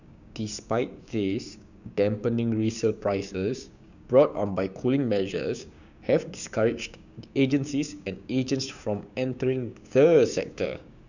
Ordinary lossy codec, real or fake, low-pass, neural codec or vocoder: none; fake; 7.2 kHz; codec, 16 kHz, 6 kbps, DAC